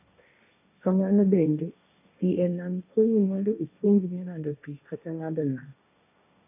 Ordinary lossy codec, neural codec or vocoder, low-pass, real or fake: none; codec, 16 kHz, 1.1 kbps, Voila-Tokenizer; 3.6 kHz; fake